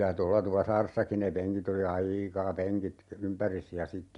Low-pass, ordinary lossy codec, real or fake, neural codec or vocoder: 10.8 kHz; MP3, 64 kbps; real; none